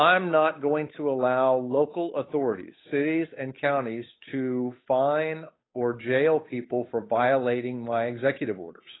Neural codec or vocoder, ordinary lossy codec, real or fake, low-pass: codec, 16 kHz, 8 kbps, FunCodec, trained on Chinese and English, 25 frames a second; AAC, 16 kbps; fake; 7.2 kHz